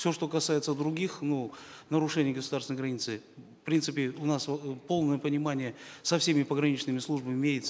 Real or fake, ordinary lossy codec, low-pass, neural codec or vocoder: real; none; none; none